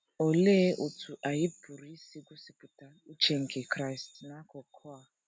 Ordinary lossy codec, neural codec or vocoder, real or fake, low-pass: none; none; real; none